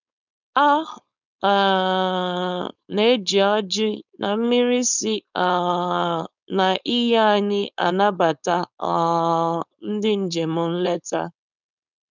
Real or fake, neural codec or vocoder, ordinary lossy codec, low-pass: fake; codec, 16 kHz, 4.8 kbps, FACodec; none; 7.2 kHz